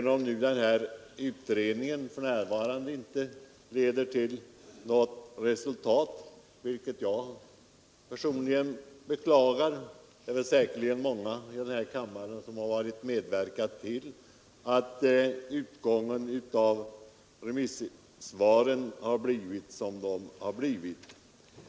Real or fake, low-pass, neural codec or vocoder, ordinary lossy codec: real; none; none; none